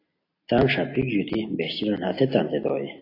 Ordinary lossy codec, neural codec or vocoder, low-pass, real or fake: AAC, 32 kbps; none; 5.4 kHz; real